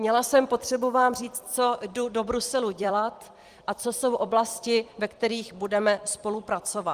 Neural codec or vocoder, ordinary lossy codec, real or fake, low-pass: vocoder, 44.1 kHz, 128 mel bands every 256 samples, BigVGAN v2; Opus, 24 kbps; fake; 14.4 kHz